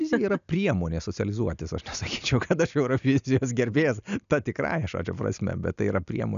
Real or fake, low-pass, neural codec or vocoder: real; 7.2 kHz; none